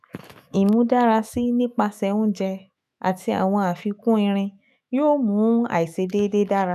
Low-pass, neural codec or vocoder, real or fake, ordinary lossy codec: 14.4 kHz; autoencoder, 48 kHz, 128 numbers a frame, DAC-VAE, trained on Japanese speech; fake; none